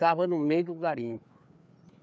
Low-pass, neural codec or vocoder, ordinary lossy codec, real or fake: none; codec, 16 kHz, 4 kbps, FreqCodec, larger model; none; fake